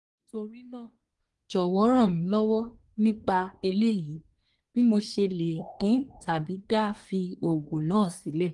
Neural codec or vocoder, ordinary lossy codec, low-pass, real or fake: codec, 24 kHz, 1 kbps, SNAC; Opus, 24 kbps; 10.8 kHz; fake